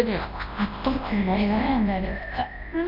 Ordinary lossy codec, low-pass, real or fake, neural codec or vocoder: MP3, 48 kbps; 5.4 kHz; fake; codec, 24 kHz, 0.9 kbps, WavTokenizer, large speech release